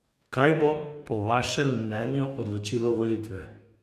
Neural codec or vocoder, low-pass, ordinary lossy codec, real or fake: codec, 44.1 kHz, 2.6 kbps, DAC; 14.4 kHz; none; fake